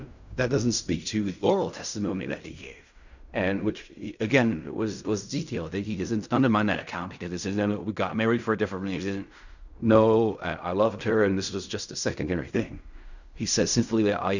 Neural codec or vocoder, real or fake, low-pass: codec, 16 kHz in and 24 kHz out, 0.4 kbps, LongCat-Audio-Codec, fine tuned four codebook decoder; fake; 7.2 kHz